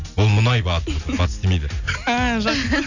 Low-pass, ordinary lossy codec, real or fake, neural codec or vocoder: 7.2 kHz; none; real; none